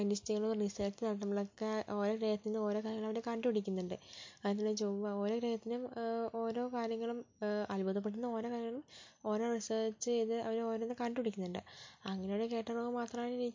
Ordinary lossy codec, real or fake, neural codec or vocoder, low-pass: MP3, 48 kbps; real; none; 7.2 kHz